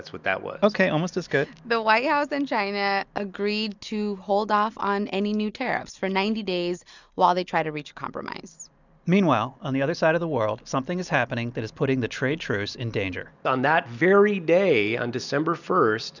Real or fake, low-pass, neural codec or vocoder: real; 7.2 kHz; none